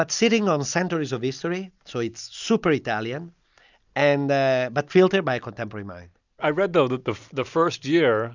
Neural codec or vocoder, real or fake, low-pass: none; real; 7.2 kHz